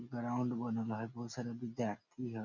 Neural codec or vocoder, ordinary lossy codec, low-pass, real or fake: none; none; 7.2 kHz; real